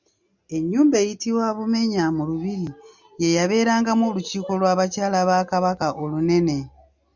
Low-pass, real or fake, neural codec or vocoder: 7.2 kHz; real; none